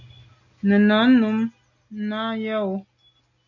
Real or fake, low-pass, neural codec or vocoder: real; 7.2 kHz; none